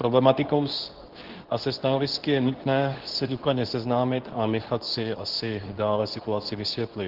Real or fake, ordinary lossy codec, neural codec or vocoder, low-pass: fake; Opus, 16 kbps; codec, 24 kHz, 0.9 kbps, WavTokenizer, medium speech release version 2; 5.4 kHz